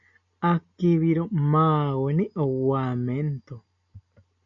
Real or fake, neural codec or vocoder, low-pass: real; none; 7.2 kHz